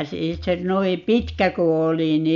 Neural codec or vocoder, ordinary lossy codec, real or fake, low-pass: none; none; real; 7.2 kHz